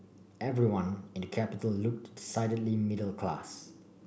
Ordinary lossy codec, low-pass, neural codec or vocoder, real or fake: none; none; none; real